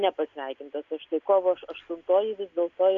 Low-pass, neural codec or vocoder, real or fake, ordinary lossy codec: 7.2 kHz; none; real; MP3, 64 kbps